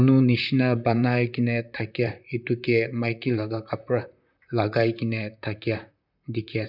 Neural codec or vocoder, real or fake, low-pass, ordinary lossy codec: vocoder, 44.1 kHz, 128 mel bands, Pupu-Vocoder; fake; 5.4 kHz; none